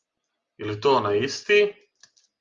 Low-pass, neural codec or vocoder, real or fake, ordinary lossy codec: 7.2 kHz; none; real; Opus, 32 kbps